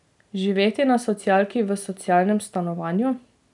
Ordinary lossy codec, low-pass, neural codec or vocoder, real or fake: none; 10.8 kHz; none; real